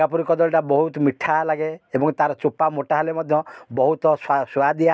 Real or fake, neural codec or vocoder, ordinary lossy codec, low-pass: real; none; none; none